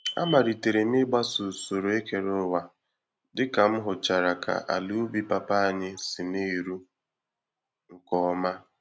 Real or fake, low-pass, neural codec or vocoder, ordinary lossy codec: real; none; none; none